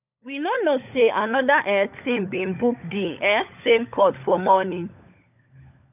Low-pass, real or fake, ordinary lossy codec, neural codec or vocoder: 3.6 kHz; fake; none; codec, 16 kHz, 16 kbps, FunCodec, trained on LibriTTS, 50 frames a second